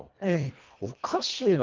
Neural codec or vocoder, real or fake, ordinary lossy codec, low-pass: codec, 24 kHz, 1.5 kbps, HILCodec; fake; Opus, 24 kbps; 7.2 kHz